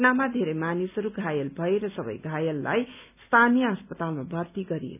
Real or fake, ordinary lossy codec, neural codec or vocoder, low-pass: real; none; none; 3.6 kHz